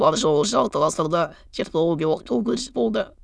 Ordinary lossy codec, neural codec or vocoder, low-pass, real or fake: none; autoencoder, 22.05 kHz, a latent of 192 numbers a frame, VITS, trained on many speakers; none; fake